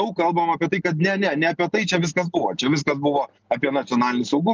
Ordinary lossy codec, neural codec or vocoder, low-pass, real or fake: Opus, 24 kbps; none; 7.2 kHz; real